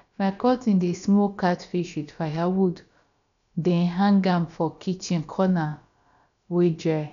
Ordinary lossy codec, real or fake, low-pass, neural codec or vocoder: none; fake; 7.2 kHz; codec, 16 kHz, about 1 kbps, DyCAST, with the encoder's durations